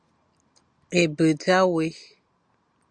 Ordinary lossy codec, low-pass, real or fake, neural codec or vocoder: Opus, 64 kbps; 9.9 kHz; real; none